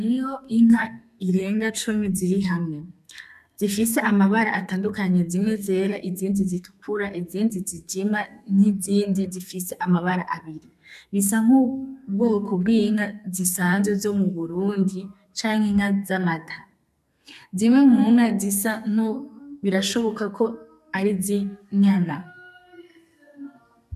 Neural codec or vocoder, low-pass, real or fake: codec, 32 kHz, 1.9 kbps, SNAC; 14.4 kHz; fake